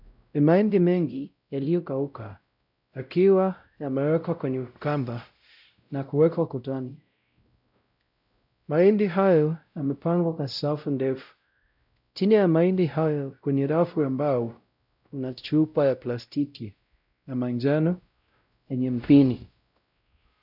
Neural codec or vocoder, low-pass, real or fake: codec, 16 kHz, 0.5 kbps, X-Codec, WavLM features, trained on Multilingual LibriSpeech; 5.4 kHz; fake